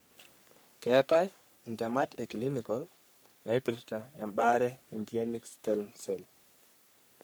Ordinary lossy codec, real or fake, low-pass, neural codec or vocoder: none; fake; none; codec, 44.1 kHz, 3.4 kbps, Pupu-Codec